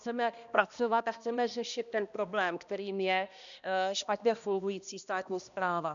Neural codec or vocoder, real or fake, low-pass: codec, 16 kHz, 1 kbps, X-Codec, HuBERT features, trained on balanced general audio; fake; 7.2 kHz